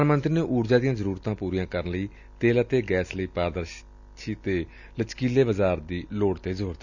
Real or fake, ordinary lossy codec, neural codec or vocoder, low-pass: real; none; none; 7.2 kHz